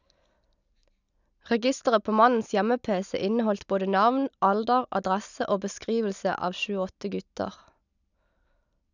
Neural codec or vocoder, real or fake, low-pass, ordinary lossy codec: none; real; 7.2 kHz; none